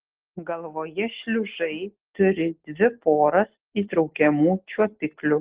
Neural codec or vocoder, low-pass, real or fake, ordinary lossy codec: none; 3.6 kHz; real; Opus, 16 kbps